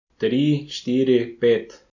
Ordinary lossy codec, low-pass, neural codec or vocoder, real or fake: none; 7.2 kHz; none; real